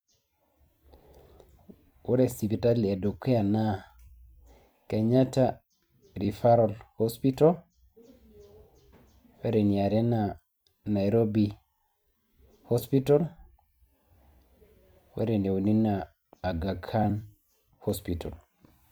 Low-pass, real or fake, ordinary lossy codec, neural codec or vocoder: none; fake; none; vocoder, 44.1 kHz, 128 mel bands every 256 samples, BigVGAN v2